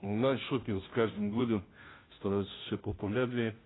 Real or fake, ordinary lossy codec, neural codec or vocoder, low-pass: fake; AAC, 16 kbps; codec, 16 kHz, 0.5 kbps, FunCodec, trained on Chinese and English, 25 frames a second; 7.2 kHz